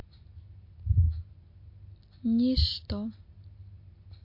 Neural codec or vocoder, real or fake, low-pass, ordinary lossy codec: none; real; 5.4 kHz; MP3, 32 kbps